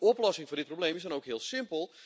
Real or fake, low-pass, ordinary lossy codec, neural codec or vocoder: real; none; none; none